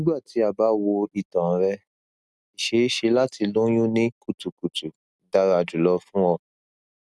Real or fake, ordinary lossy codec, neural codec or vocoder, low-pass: real; none; none; none